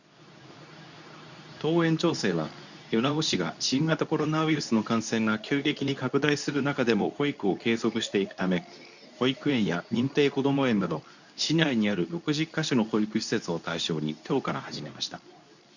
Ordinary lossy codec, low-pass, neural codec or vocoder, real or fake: none; 7.2 kHz; codec, 24 kHz, 0.9 kbps, WavTokenizer, medium speech release version 2; fake